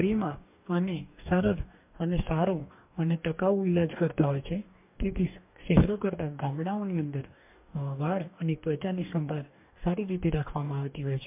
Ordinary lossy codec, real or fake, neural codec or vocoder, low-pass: MP3, 32 kbps; fake; codec, 44.1 kHz, 2.6 kbps, DAC; 3.6 kHz